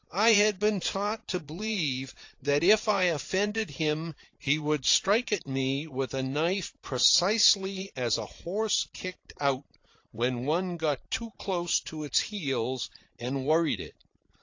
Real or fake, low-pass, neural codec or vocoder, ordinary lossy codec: fake; 7.2 kHz; vocoder, 44.1 kHz, 80 mel bands, Vocos; AAC, 48 kbps